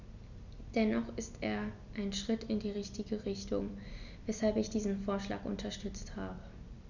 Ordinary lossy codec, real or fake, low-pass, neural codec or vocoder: none; real; 7.2 kHz; none